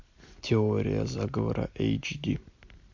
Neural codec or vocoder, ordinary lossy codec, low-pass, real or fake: none; MP3, 48 kbps; 7.2 kHz; real